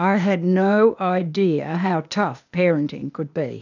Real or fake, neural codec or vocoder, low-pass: fake; codec, 16 kHz, 0.8 kbps, ZipCodec; 7.2 kHz